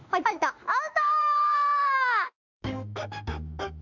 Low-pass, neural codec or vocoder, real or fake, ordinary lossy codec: 7.2 kHz; autoencoder, 48 kHz, 32 numbers a frame, DAC-VAE, trained on Japanese speech; fake; none